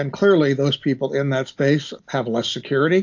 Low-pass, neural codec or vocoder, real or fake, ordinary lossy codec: 7.2 kHz; none; real; AAC, 48 kbps